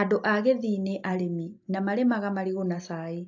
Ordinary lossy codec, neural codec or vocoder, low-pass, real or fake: AAC, 48 kbps; none; 7.2 kHz; real